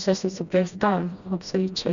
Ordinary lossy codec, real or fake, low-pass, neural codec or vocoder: Opus, 64 kbps; fake; 7.2 kHz; codec, 16 kHz, 1 kbps, FreqCodec, smaller model